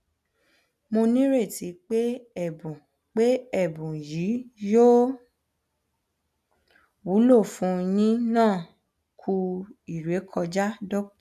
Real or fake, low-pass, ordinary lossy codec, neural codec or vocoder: real; 14.4 kHz; none; none